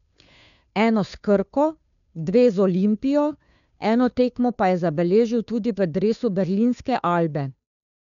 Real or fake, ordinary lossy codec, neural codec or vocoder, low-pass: fake; none; codec, 16 kHz, 2 kbps, FunCodec, trained on Chinese and English, 25 frames a second; 7.2 kHz